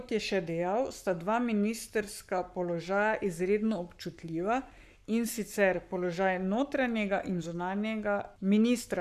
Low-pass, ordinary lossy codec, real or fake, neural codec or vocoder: 14.4 kHz; none; fake; codec, 44.1 kHz, 7.8 kbps, Pupu-Codec